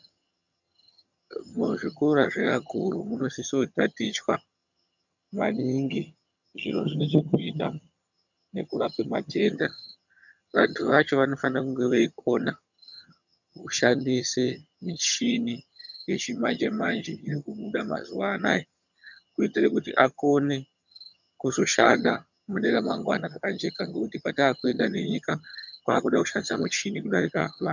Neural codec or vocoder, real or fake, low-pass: vocoder, 22.05 kHz, 80 mel bands, HiFi-GAN; fake; 7.2 kHz